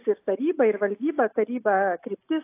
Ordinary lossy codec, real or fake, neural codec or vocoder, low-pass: AAC, 24 kbps; real; none; 3.6 kHz